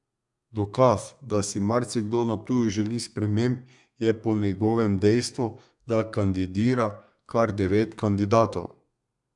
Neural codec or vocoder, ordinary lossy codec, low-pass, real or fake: codec, 32 kHz, 1.9 kbps, SNAC; none; 10.8 kHz; fake